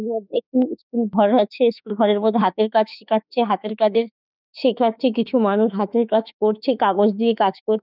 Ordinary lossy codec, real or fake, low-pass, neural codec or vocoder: none; fake; 5.4 kHz; autoencoder, 48 kHz, 32 numbers a frame, DAC-VAE, trained on Japanese speech